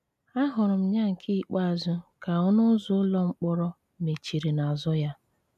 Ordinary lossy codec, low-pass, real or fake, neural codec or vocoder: none; 14.4 kHz; real; none